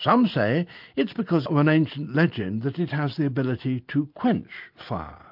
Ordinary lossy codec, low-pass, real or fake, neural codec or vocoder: MP3, 48 kbps; 5.4 kHz; real; none